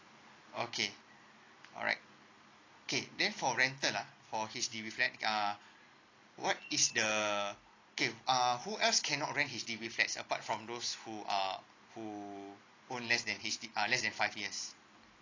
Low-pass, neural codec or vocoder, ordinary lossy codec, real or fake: 7.2 kHz; none; AAC, 32 kbps; real